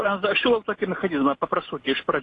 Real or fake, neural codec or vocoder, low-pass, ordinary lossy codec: real; none; 10.8 kHz; AAC, 32 kbps